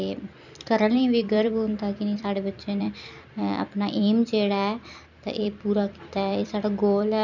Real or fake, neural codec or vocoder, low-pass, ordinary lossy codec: fake; vocoder, 44.1 kHz, 128 mel bands every 256 samples, BigVGAN v2; 7.2 kHz; none